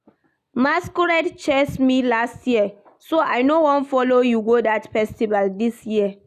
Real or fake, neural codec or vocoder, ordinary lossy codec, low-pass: real; none; none; 14.4 kHz